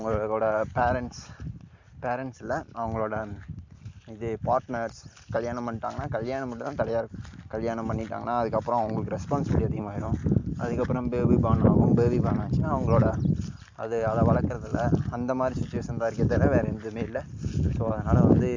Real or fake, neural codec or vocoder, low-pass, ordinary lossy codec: real; none; 7.2 kHz; MP3, 64 kbps